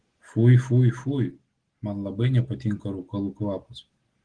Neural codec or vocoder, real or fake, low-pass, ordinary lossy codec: none; real; 9.9 kHz; Opus, 16 kbps